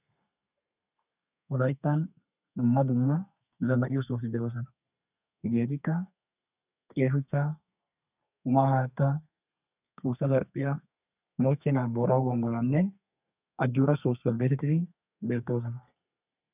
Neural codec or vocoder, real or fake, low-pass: codec, 32 kHz, 1.9 kbps, SNAC; fake; 3.6 kHz